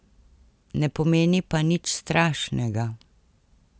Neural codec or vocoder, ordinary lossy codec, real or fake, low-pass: none; none; real; none